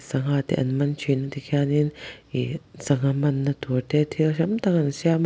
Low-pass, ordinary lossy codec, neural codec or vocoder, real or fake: none; none; none; real